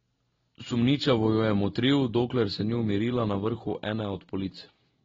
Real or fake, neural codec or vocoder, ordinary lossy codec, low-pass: real; none; AAC, 24 kbps; 7.2 kHz